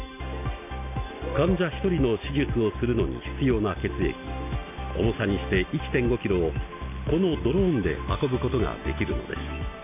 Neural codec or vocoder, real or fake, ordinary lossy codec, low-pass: none; real; AAC, 32 kbps; 3.6 kHz